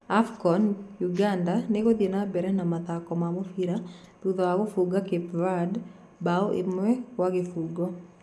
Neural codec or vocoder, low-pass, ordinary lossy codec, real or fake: none; none; none; real